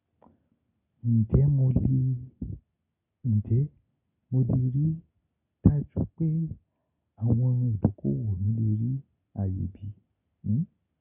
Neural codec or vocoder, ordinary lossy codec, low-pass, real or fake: none; none; 3.6 kHz; real